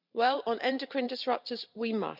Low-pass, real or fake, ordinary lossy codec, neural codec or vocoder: 5.4 kHz; fake; none; vocoder, 44.1 kHz, 128 mel bands every 512 samples, BigVGAN v2